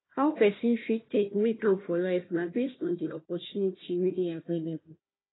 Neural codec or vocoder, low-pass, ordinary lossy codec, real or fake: codec, 16 kHz, 1 kbps, FunCodec, trained on Chinese and English, 50 frames a second; 7.2 kHz; AAC, 16 kbps; fake